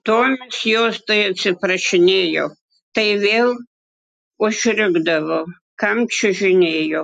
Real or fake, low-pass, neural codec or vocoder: real; 10.8 kHz; none